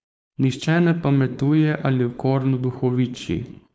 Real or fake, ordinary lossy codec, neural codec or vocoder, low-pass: fake; none; codec, 16 kHz, 4.8 kbps, FACodec; none